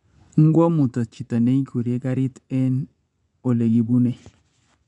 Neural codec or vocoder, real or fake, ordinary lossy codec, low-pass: vocoder, 24 kHz, 100 mel bands, Vocos; fake; none; 10.8 kHz